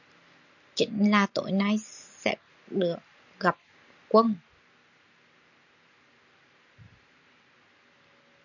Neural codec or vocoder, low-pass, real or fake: none; 7.2 kHz; real